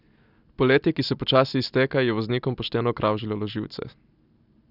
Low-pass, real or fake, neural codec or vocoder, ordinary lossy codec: 5.4 kHz; real; none; none